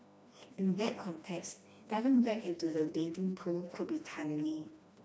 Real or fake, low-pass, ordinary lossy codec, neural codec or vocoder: fake; none; none; codec, 16 kHz, 1 kbps, FreqCodec, smaller model